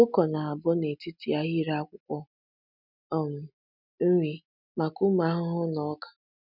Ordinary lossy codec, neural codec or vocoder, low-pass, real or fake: none; none; 5.4 kHz; real